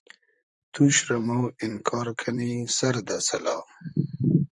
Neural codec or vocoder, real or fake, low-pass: vocoder, 44.1 kHz, 128 mel bands, Pupu-Vocoder; fake; 10.8 kHz